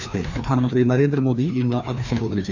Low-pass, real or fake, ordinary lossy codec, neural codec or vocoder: 7.2 kHz; fake; none; codec, 16 kHz, 2 kbps, FreqCodec, larger model